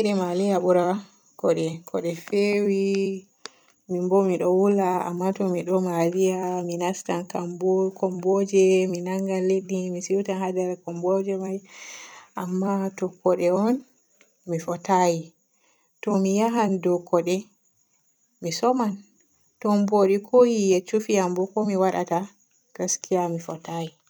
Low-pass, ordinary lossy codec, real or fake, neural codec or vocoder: none; none; fake; vocoder, 44.1 kHz, 128 mel bands every 256 samples, BigVGAN v2